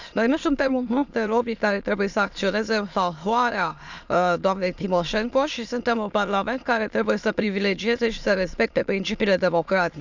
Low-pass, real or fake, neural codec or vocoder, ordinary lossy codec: 7.2 kHz; fake; autoencoder, 22.05 kHz, a latent of 192 numbers a frame, VITS, trained on many speakers; none